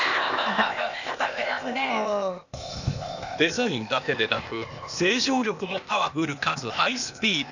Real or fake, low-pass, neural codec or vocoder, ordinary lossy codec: fake; 7.2 kHz; codec, 16 kHz, 0.8 kbps, ZipCodec; none